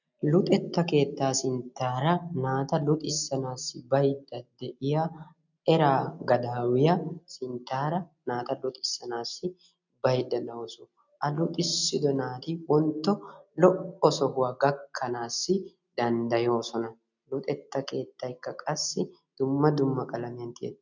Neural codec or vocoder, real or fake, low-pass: none; real; 7.2 kHz